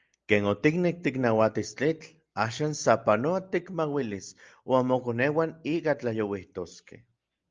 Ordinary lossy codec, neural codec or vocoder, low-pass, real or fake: Opus, 24 kbps; none; 7.2 kHz; real